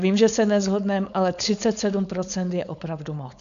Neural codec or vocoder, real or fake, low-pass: codec, 16 kHz, 4.8 kbps, FACodec; fake; 7.2 kHz